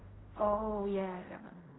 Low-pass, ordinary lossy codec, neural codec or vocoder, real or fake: 7.2 kHz; AAC, 16 kbps; codec, 16 kHz in and 24 kHz out, 0.4 kbps, LongCat-Audio-Codec, fine tuned four codebook decoder; fake